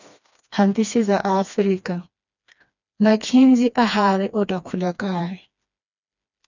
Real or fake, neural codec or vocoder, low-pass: fake; codec, 16 kHz, 2 kbps, FreqCodec, smaller model; 7.2 kHz